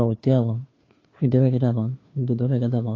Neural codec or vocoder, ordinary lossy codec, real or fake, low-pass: codec, 16 kHz, 2 kbps, FunCodec, trained on Chinese and English, 25 frames a second; AAC, 32 kbps; fake; 7.2 kHz